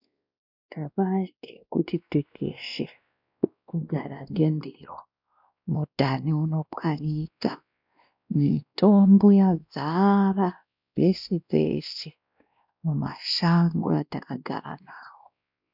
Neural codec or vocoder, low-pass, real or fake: codec, 16 kHz, 1 kbps, X-Codec, WavLM features, trained on Multilingual LibriSpeech; 5.4 kHz; fake